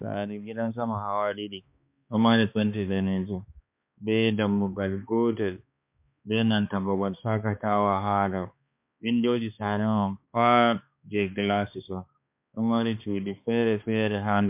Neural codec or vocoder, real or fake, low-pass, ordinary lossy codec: codec, 16 kHz, 2 kbps, X-Codec, HuBERT features, trained on balanced general audio; fake; 3.6 kHz; MP3, 32 kbps